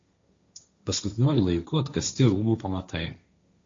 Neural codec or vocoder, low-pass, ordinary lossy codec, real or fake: codec, 16 kHz, 1.1 kbps, Voila-Tokenizer; 7.2 kHz; MP3, 48 kbps; fake